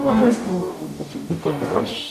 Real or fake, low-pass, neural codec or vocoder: fake; 14.4 kHz; codec, 44.1 kHz, 0.9 kbps, DAC